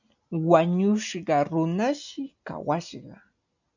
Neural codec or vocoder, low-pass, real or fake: none; 7.2 kHz; real